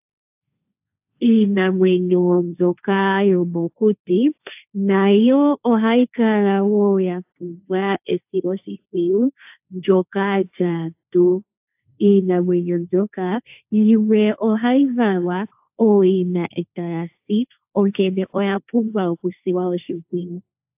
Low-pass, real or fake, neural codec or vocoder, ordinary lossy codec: 3.6 kHz; fake; codec, 16 kHz, 1.1 kbps, Voila-Tokenizer; AAC, 32 kbps